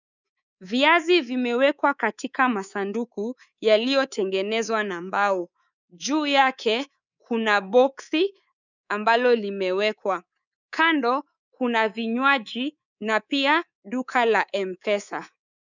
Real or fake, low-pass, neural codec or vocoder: fake; 7.2 kHz; codec, 24 kHz, 3.1 kbps, DualCodec